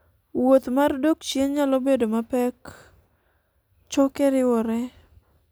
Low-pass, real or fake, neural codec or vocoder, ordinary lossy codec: none; real; none; none